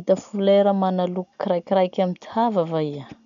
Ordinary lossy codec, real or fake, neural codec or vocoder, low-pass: none; real; none; 7.2 kHz